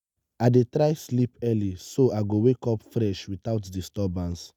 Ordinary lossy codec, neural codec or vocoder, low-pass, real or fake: none; none; 19.8 kHz; real